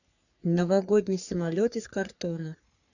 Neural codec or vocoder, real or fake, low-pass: codec, 44.1 kHz, 3.4 kbps, Pupu-Codec; fake; 7.2 kHz